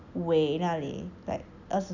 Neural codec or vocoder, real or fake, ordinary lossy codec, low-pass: none; real; none; 7.2 kHz